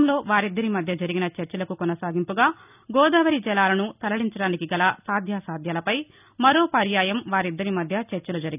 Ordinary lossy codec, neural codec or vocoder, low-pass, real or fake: none; none; 3.6 kHz; real